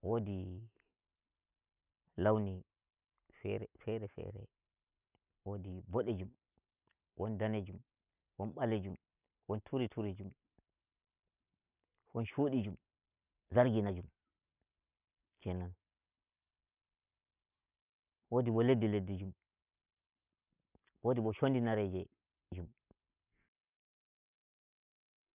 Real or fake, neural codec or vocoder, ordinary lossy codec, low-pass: real; none; none; 3.6 kHz